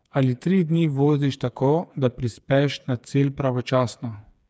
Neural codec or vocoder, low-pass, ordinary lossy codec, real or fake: codec, 16 kHz, 4 kbps, FreqCodec, smaller model; none; none; fake